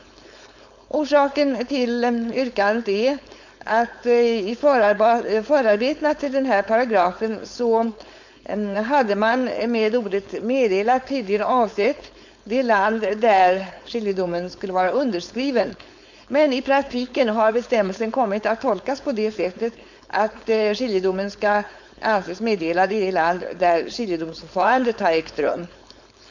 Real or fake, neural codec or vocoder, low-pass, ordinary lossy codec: fake; codec, 16 kHz, 4.8 kbps, FACodec; 7.2 kHz; none